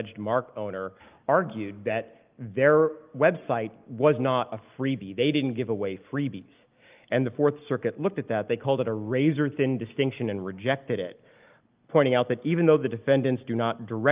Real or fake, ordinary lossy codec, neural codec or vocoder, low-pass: real; Opus, 24 kbps; none; 3.6 kHz